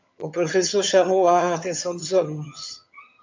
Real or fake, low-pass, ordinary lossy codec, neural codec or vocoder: fake; 7.2 kHz; MP3, 64 kbps; vocoder, 22.05 kHz, 80 mel bands, HiFi-GAN